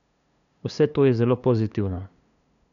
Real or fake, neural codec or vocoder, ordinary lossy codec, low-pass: fake; codec, 16 kHz, 2 kbps, FunCodec, trained on LibriTTS, 25 frames a second; none; 7.2 kHz